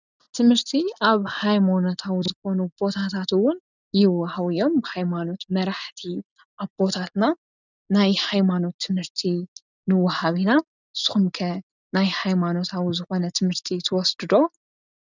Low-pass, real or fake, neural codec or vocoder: 7.2 kHz; real; none